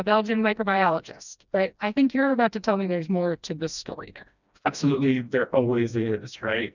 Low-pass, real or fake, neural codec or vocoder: 7.2 kHz; fake; codec, 16 kHz, 1 kbps, FreqCodec, smaller model